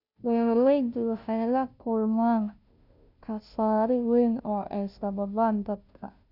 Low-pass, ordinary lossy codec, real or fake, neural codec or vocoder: 5.4 kHz; none; fake; codec, 16 kHz, 0.5 kbps, FunCodec, trained on Chinese and English, 25 frames a second